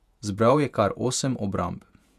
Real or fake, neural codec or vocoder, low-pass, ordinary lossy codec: fake; vocoder, 48 kHz, 128 mel bands, Vocos; 14.4 kHz; none